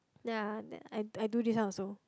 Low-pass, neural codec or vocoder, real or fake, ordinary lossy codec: none; none; real; none